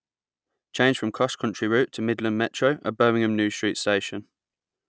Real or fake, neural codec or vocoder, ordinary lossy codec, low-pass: real; none; none; none